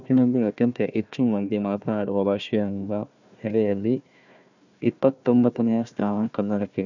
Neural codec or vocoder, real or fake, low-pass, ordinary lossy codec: codec, 16 kHz, 1 kbps, FunCodec, trained on Chinese and English, 50 frames a second; fake; 7.2 kHz; none